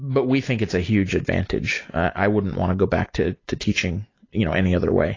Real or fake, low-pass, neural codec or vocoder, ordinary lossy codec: real; 7.2 kHz; none; AAC, 32 kbps